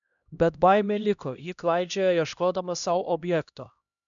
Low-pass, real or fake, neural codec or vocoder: 7.2 kHz; fake; codec, 16 kHz, 1 kbps, X-Codec, HuBERT features, trained on LibriSpeech